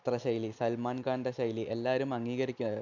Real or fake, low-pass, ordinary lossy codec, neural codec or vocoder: real; 7.2 kHz; none; none